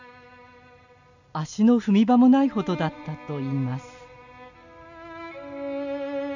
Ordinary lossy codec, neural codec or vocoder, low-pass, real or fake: none; none; 7.2 kHz; real